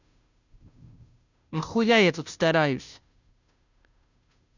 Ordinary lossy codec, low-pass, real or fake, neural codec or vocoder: none; 7.2 kHz; fake; codec, 16 kHz, 0.5 kbps, FunCodec, trained on Chinese and English, 25 frames a second